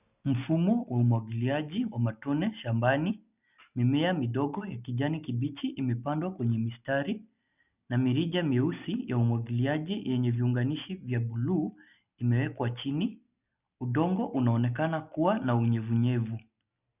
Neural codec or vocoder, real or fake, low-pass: none; real; 3.6 kHz